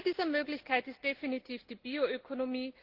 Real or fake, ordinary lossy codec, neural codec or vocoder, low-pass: real; Opus, 16 kbps; none; 5.4 kHz